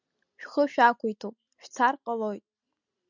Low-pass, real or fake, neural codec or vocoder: 7.2 kHz; real; none